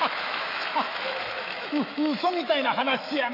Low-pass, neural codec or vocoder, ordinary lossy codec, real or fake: 5.4 kHz; autoencoder, 48 kHz, 128 numbers a frame, DAC-VAE, trained on Japanese speech; AAC, 32 kbps; fake